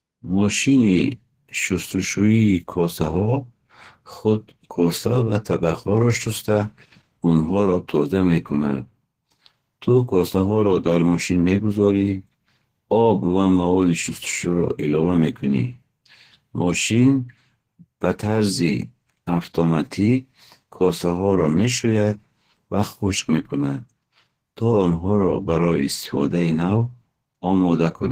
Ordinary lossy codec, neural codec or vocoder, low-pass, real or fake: Opus, 16 kbps; codec, 32 kHz, 1.9 kbps, SNAC; 14.4 kHz; fake